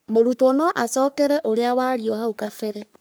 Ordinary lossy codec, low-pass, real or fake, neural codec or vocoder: none; none; fake; codec, 44.1 kHz, 3.4 kbps, Pupu-Codec